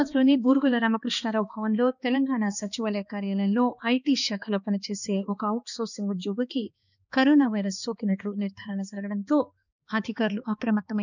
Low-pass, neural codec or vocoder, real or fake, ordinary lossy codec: 7.2 kHz; codec, 16 kHz, 2 kbps, X-Codec, HuBERT features, trained on balanced general audio; fake; none